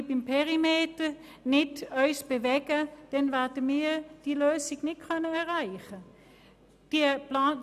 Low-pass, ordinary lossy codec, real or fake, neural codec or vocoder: 14.4 kHz; none; real; none